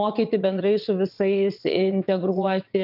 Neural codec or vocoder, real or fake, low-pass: vocoder, 22.05 kHz, 80 mel bands, WaveNeXt; fake; 5.4 kHz